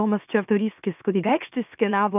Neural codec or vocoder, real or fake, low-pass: autoencoder, 44.1 kHz, a latent of 192 numbers a frame, MeloTTS; fake; 3.6 kHz